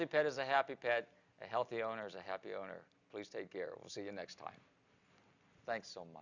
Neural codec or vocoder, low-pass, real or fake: none; 7.2 kHz; real